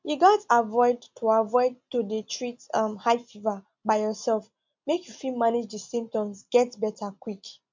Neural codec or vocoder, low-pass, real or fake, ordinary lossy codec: none; 7.2 kHz; real; MP3, 64 kbps